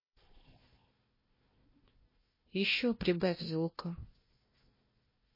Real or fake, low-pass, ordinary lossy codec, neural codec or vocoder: fake; 5.4 kHz; MP3, 24 kbps; codec, 16 kHz, 1 kbps, FunCodec, trained on Chinese and English, 50 frames a second